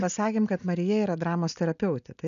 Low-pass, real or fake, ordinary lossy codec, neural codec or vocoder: 7.2 kHz; real; MP3, 96 kbps; none